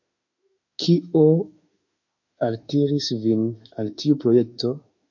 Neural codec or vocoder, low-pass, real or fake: autoencoder, 48 kHz, 32 numbers a frame, DAC-VAE, trained on Japanese speech; 7.2 kHz; fake